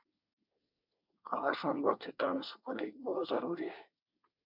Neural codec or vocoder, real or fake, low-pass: codec, 24 kHz, 1 kbps, SNAC; fake; 5.4 kHz